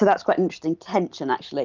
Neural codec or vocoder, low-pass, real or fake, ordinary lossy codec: none; 7.2 kHz; real; Opus, 24 kbps